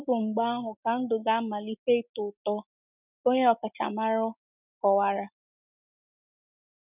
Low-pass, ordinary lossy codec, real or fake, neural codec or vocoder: 3.6 kHz; none; real; none